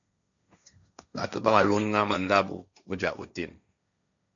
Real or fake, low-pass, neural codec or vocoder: fake; 7.2 kHz; codec, 16 kHz, 1.1 kbps, Voila-Tokenizer